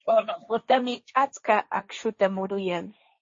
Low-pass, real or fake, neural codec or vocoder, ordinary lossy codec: 7.2 kHz; fake; codec, 16 kHz, 1.1 kbps, Voila-Tokenizer; MP3, 32 kbps